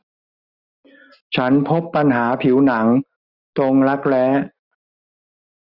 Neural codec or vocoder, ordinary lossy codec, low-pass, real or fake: none; none; 5.4 kHz; real